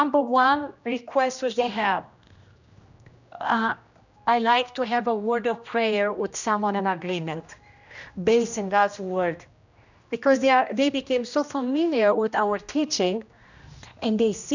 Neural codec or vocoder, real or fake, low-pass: codec, 16 kHz, 1 kbps, X-Codec, HuBERT features, trained on general audio; fake; 7.2 kHz